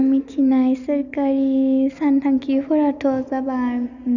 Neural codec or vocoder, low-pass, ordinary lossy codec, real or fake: none; 7.2 kHz; none; real